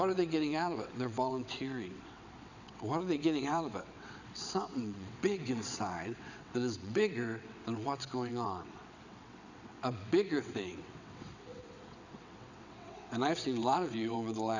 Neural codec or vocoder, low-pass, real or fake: vocoder, 22.05 kHz, 80 mel bands, WaveNeXt; 7.2 kHz; fake